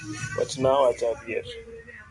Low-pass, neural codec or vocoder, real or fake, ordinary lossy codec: 10.8 kHz; none; real; MP3, 64 kbps